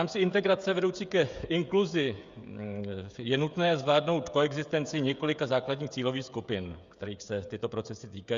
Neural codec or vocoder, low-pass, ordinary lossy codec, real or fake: codec, 16 kHz, 16 kbps, FreqCodec, smaller model; 7.2 kHz; Opus, 64 kbps; fake